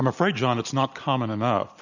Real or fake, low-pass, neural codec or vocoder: real; 7.2 kHz; none